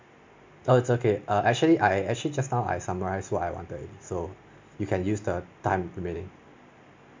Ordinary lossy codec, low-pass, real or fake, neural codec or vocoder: MP3, 64 kbps; 7.2 kHz; real; none